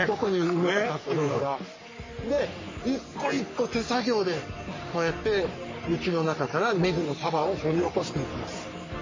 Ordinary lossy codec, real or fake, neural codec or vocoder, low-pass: MP3, 32 kbps; fake; codec, 44.1 kHz, 3.4 kbps, Pupu-Codec; 7.2 kHz